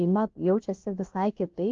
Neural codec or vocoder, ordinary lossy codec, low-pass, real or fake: codec, 16 kHz, about 1 kbps, DyCAST, with the encoder's durations; Opus, 32 kbps; 7.2 kHz; fake